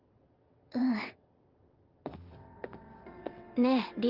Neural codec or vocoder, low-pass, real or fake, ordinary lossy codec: vocoder, 22.05 kHz, 80 mel bands, WaveNeXt; 5.4 kHz; fake; Opus, 32 kbps